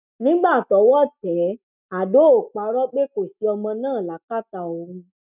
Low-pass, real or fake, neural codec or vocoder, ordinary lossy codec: 3.6 kHz; real; none; none